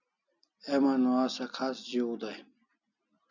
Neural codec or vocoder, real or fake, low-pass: none; real; 7.2 kHz